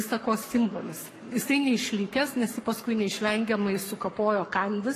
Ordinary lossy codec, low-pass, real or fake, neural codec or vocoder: AAC, 48 kbps; 14.4 kHz; fake; codec, 44.1 kHz, 7.8 kbps, Pupu-Codec